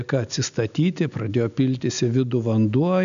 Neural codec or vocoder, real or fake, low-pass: none; real; 7.2 kHz